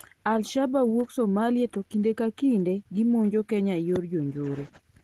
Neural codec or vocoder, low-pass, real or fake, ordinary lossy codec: none; 14.4 kHz; real; Opus, 16 kbps